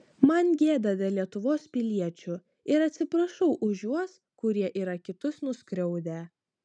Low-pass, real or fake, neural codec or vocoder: 9.9 kHz; real; none